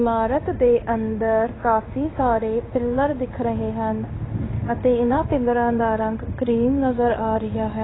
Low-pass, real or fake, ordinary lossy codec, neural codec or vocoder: 7.2 kHz; fake; AAC, 16 kbps; codec, 16 kHz in and 24 kHz out, 1 kbps, XY-Tokenizer